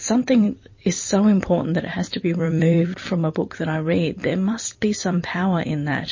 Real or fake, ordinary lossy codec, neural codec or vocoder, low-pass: fake; MP3, 32 kbps; vocoder, 44.1 kHz, 128 mel bands every 256 samples, BigVGAN v2; 7.2 kHz